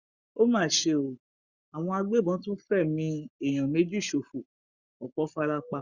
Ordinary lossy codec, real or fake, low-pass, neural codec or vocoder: Opus, 64 kbps; fake; 7.2 kHz; codec, 44.1 kHz, 7.8 kbps, Pupu-Codec